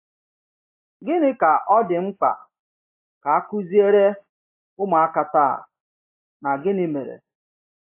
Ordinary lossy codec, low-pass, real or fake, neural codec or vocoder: AAC, 24 kbps; 3.6 kHz; real; none